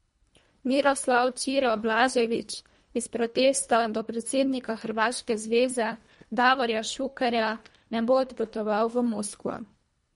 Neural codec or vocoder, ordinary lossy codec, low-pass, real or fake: codec, 24 kHz, 1.5 kbps, HILCodec; MP3, 48 kbps; 10.8 kHz; fake